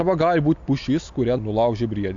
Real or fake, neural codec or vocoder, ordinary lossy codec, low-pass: real; none; AAC, 64 kbps; 7.2 kHz